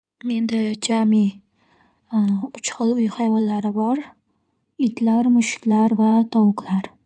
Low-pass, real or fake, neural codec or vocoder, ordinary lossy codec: 9.9 kHz; fake; codec, 16 kHz in and 24 kHz out, 2.2 kbps, FireRedTTS-2 codec; none